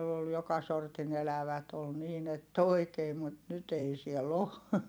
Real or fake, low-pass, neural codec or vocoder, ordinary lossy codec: fake; none; vocoder, 44.1 kHz, 128 mel bands every 256 samples, BigVGAN v2; none